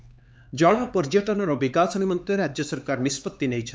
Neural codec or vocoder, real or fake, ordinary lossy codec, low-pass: codec, 16 kHz, 4 kbps, X-Codec, HuBERT features, trained on LibriSpeech; fake; none; none